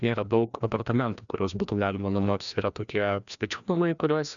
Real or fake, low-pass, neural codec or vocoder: fake; 7.2 kHz; codec, 16 kHz, 1 kbps, FreqCodec, larger model